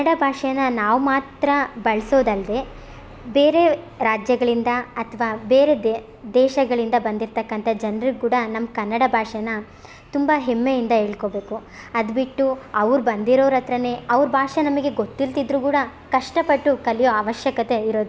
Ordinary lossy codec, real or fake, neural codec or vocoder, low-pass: none; real; none; none